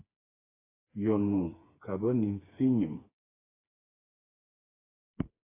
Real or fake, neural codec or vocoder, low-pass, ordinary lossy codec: fake; codec, 16 kHz, 4 kbps, FreqCodec, smaller model; 3.6 kHz; AAC, 32 kbps